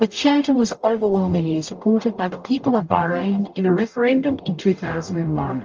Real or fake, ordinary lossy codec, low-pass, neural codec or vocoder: fake; Opus, 24 kbps; 7.2 kHz; codec, 44.1 kHz, 0.9 kbps, DAC